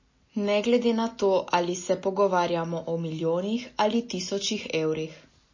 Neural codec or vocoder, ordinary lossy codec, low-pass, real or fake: none; MP3, 32 kbps; 7.2 kHz; real